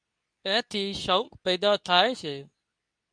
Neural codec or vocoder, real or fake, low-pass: codec, 24 kHz, 0.9 kbps, WavTokenizer, medium speech release version 2; fake; 9.9 kHz